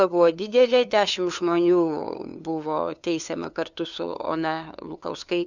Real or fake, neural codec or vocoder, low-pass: fake; codec, 16 kHz, 2 kbps, FunCodec, trained on LibriTTS, 25 frames a second; 7.2 kHz